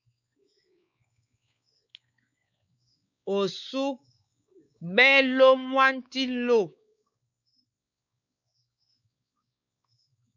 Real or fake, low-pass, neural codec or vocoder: fake; 7.2 kHz; codec, 16 kHz, 4 kbps, X-Codec, WavLM features, trained on Multilingual LibriSpeech